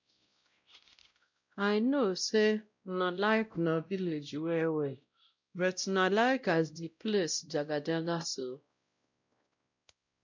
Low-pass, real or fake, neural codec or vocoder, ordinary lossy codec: 7.2 kHz; fake; codec, 16 kHz, 0.5 kbps, X-Codec, WavLM features, trained on Multilingual LibriSpeech; MP3, 64 kbps